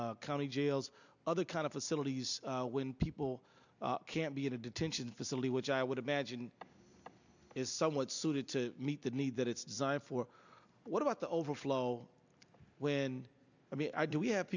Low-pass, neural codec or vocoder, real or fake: 7.2 kHz; none; real